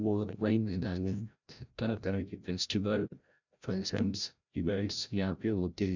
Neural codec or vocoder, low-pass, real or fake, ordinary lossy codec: codec, 16 kHz, 0.5 kbps, FreqCodec, larger model; 7.2 kHz; fake; none